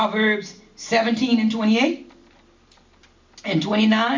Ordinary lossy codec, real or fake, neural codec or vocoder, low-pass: MP3, 64 kbps; real; none; 7.2 kHz